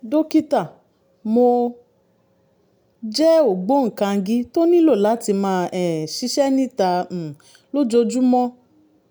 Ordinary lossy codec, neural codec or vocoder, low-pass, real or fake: none; none; none; real